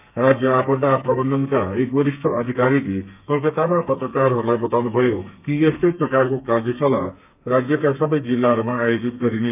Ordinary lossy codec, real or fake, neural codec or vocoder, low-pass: none; fake; codec, 32 kHz, 1.9 kbps, SNAC; 3.6 kHz